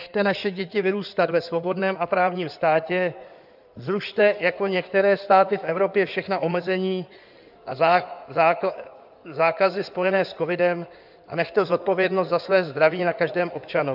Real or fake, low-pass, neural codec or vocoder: fake; 5.4 kHz; codec, 16 kHz in and 24 kHz out, 2.2 kbps, FireRedTTS-2 codec